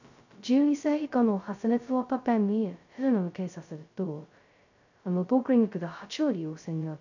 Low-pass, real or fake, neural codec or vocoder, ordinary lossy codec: 7.2 kHz; fake; codec, 16 kHz, 0.2 kbps, FocalCodec; none